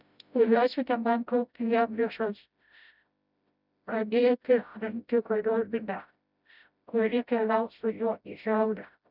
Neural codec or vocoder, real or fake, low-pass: codec, 16 kHz, 0.5 kbps, FreqCodec, smaller model; fake; 5.4 kHz